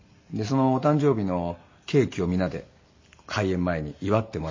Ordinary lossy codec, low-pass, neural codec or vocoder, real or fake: MP3, 32 kbps; 7.2 kHz; none; real